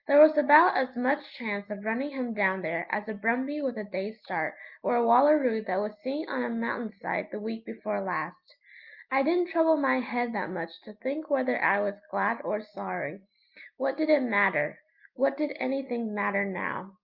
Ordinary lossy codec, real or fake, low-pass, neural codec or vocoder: Opus, 32 kbps; real; 5.4 kHz; none